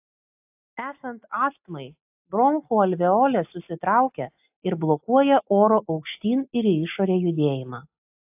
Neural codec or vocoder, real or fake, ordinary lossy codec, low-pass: none; real; AAC, 32 kbps; 3.6 kHz